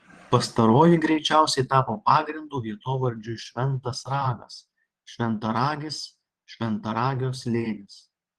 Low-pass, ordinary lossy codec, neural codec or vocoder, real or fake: 9.9 kHz; Opus, 16 kbps; vocoder, 22.05 kHz, 80 mel bands, Vocos; fake